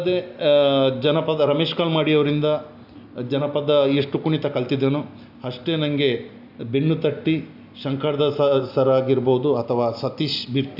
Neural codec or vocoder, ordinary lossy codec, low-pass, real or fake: none; AAC, 48 kbps; 5.4 kHz; real